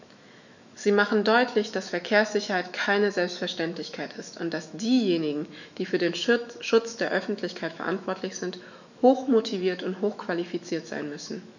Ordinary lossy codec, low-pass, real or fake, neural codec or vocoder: none; 7.2 kHz; fake; autoencoder, 48 kHz, 128 numbers a frame, DAC-VAE, trained on Japanese speech